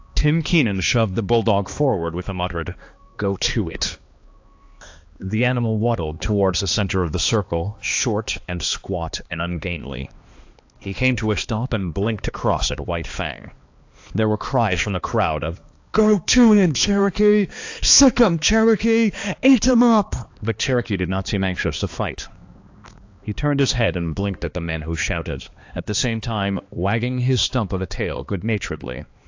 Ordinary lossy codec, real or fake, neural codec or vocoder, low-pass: AAC, 48 kbps; fake; codec, 16 kHz, 2 kbps, X-Codec, HuBERT features, trained on balanced general audio; 7.2 kHz